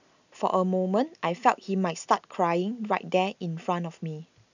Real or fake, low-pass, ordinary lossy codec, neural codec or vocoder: real; 7.2 kHz; none; none